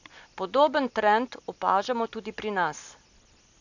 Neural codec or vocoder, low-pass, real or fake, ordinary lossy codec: none; 7.2 kHz; real; none